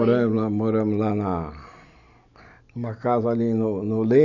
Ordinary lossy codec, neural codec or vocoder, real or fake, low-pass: none; none; real; 7.2 kHz